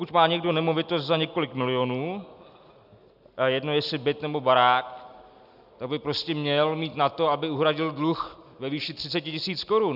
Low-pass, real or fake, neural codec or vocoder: 5.4 kHz; real; none